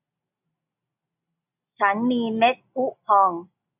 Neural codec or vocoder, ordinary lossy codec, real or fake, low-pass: none; none; real; 3.6 kHz